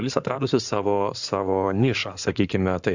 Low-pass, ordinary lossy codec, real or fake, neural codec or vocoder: 7.2 kHz; Opus, 64 kbps; fake; codec, 16 kHz in and 24 kHz out, 2.2 kbps, FireRedTTS-2 codec